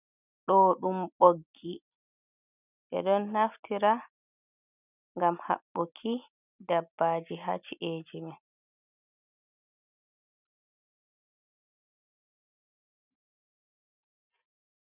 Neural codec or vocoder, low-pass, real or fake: none; 3.6 kHz; real